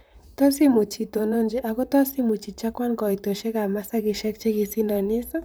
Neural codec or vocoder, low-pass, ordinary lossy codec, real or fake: vocoder, 44.1 kHz, 128 mel bands, Pupu-Vocoder; none; none; fake